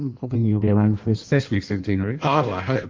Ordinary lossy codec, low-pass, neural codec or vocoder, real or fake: Opus, 32 kbps; 7.2 kHz; codec, 16 kHz in and 24 kHz out, 1.1 kbps, FireRedTTS-2 codec; fake